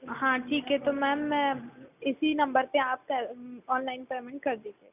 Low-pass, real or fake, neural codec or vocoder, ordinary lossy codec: 3.6 kHz; real; none; none